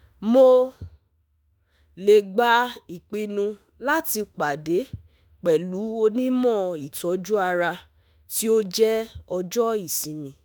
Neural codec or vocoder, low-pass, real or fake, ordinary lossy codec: autoencoder, 48 kHz, 32 numbers a frame, DAC-VAE, trained on Japanese speech; none; fake; none